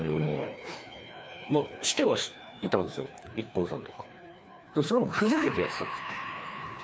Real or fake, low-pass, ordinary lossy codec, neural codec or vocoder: fake; none; none; codec, 16 kHz, 2 kbps, FreqCodec, larger model